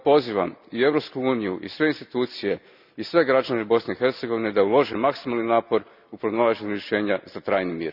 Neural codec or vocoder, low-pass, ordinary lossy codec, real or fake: none; 5.4 kHz; none; real